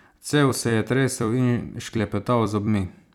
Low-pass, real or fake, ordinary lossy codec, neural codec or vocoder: 19.8 kHz; fake; none; vocoder, 44.1 kHz, 128 mel bands every 256 samples, BigVGAN v2